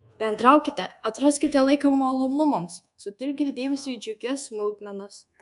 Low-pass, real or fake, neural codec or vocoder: 10.8 kHz; fake; codec, 24 kHz, 1.2 kbps, DualCodec